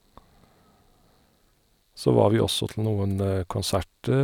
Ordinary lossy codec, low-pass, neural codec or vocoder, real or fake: none; 19.8 kHz; none; real